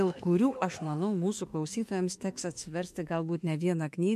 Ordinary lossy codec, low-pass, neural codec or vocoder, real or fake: MP3, 64 kbps; 14.4 kHz; autoencoder, 48 kHz, 32 numbers a frame, DAC-VAE, trained on Japanese speech; fake